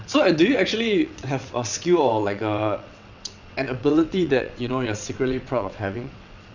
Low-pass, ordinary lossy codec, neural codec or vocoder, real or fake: 7.2 kHz; none; vocoder, 22.05 kHz, 80 mel bands, WaveNeXt; fake